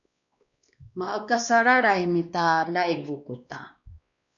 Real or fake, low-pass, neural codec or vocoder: fake; 7.2 kHz; codec, 16 kHz, 2 kbps, X-Codec, WavLM features, trained on Multilingual LibriSpeech